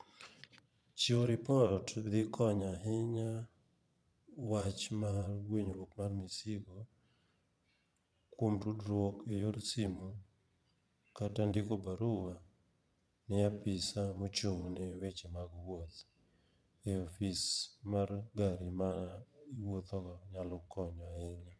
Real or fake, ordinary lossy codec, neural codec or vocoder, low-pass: fake; none; vocoder, 22.05 kHz, 80 mel bands, Vocos; none